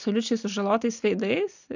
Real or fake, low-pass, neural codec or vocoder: real; 7.2 kHz; none